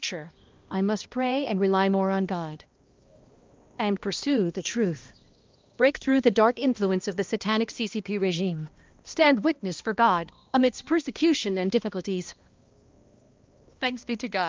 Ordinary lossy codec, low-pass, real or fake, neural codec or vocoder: Opus, 24 kbps; 7.2 kHz; fake; codec, 16 kHz, 1 kbps, X-Codec, HuBERT features, trained on balanced general audio